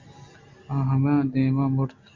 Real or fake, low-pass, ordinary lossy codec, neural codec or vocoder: real; 7.2 kHz; MP3, 48 kbps; none